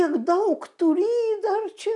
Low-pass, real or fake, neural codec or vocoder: 10.8 kHz; real; none